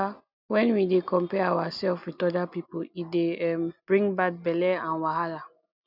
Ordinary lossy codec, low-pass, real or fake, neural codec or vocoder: none; 5.4 kHz; real; none